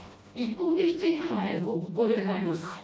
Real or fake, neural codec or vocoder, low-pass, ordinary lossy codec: fake; codec, 16 kHz, 1 kbps, FreqCodec, smaller model; none; none